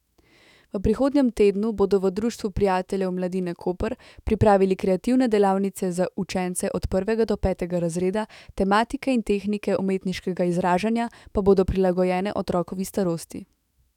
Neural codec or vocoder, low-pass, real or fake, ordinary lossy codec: autoencoder, 48 kHz, 128 numbers a frame, DAC-VAE, trained on Japanese speech; 19.8 kHz; fake; none